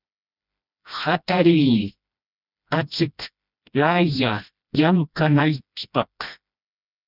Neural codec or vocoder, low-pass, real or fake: codec, 16 kHz, 1 kbps, FreqCodec, smaller model; 5.4 kHz; fake